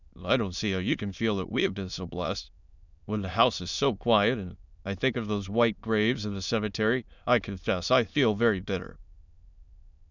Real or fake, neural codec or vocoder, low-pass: fake; autoencoder, 22.05 kHz, a latent of 192 numbers a frame, VITS, trained on many speakers; 7.2 kHz